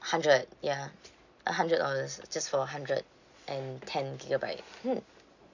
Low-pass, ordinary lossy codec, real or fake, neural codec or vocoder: 7.2 kHz; none; real; none